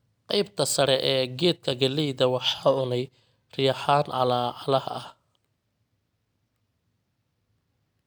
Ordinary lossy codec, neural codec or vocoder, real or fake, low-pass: none; none; real; none